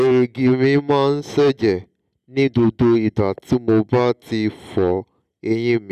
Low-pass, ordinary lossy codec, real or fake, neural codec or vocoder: 14.4 kHz; none; real; none